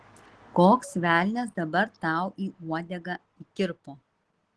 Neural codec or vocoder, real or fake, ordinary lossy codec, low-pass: none; real; Opus, 16 kbps; 10.8 kHz